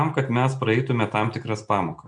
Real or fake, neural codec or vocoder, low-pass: real; none; 9.9 kHz